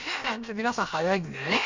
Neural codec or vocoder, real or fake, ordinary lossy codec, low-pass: codec, 16 kHz, about 1 kbps, DyCAST, with the encoder's durations; fake; MP3, 64 kbps; 7.2 kHz